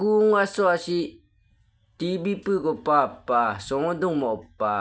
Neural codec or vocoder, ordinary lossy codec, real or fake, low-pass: none; none; real; none